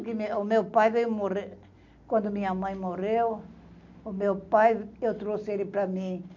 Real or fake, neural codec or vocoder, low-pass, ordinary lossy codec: real; none; 7.2 kHz; none